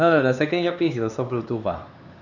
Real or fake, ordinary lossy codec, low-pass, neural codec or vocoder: fake; none; 7.2 kHz; codec, 16 kHz, 4 kbps, X-Codec, HuBERT features, trained on LibriSpeech